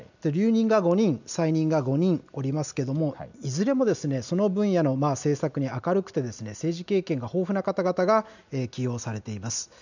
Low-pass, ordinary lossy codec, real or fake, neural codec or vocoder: 7.2 kHz; none; real; none